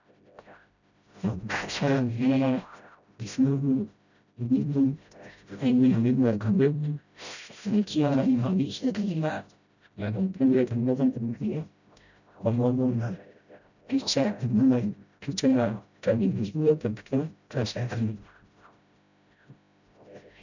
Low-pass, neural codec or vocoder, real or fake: 7.2 kHz; codec, 16 kHz, 0.5 kbps, FreqCodec, smaller model; fake